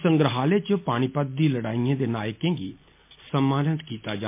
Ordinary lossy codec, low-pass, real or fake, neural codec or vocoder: MP3, 24 kbps; 3.6 kHz; real; none